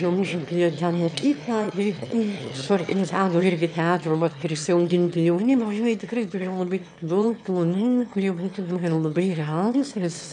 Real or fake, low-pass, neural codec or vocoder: fake; 9.9 kHz; autoencoder, 22.05 kHz, a latent of 192 numbers a frame, VITS, trained on one speaker